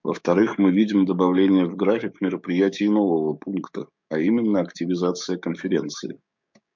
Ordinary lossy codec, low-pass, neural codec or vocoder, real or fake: MP3, 64 kbps; 7.2 kHz; codec, 16 kHz, 6 kbps, DAC; fake